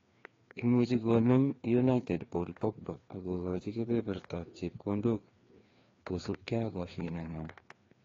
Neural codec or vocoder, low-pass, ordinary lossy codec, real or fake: codec, 16 kHz, 2 kbps, FreqCodec, larger model; 7.2 kHz; AAC, 32 kbps; fake